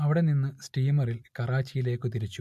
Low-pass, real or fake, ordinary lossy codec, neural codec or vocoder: 14.4 kHz; real; MP3, 64 kbps; none